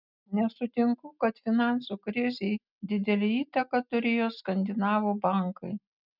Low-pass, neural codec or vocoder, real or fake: 5.4 kHz; none; real